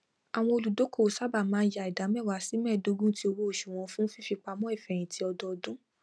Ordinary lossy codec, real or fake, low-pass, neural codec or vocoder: none; real; none; none